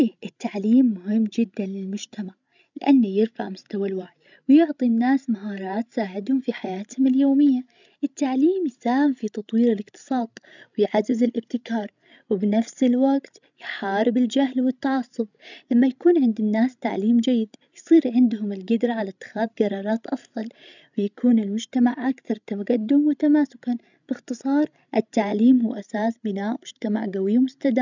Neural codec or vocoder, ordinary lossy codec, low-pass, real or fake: codec, 16 kHz, 16 kbps, FreqCodec, larger model; none; 7.2 kHz; fake